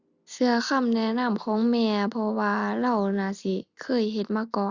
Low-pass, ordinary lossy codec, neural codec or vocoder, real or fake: 7.2 kHz; Opus, 64 kbps; none; real